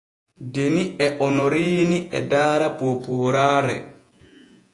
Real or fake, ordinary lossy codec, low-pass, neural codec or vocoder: fake; AAC, 48 kbps; 10.8 kHz; vocoder, 48 kHz, 128 mel bands, Vocos